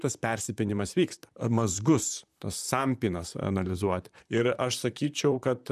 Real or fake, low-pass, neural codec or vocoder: fake; 14.4 kHz; vocoder, 44.1 kHz, 128 mel bands, Pupu-Vocoder